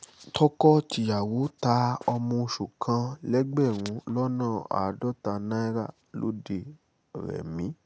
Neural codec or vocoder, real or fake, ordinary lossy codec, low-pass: none; real; none; none